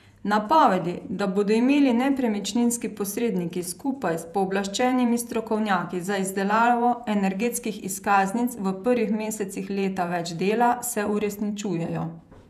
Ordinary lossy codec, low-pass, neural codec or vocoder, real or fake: none; 14.4 kHz; vocoder, 48 kHz, 128 mel bands, Vocos; fake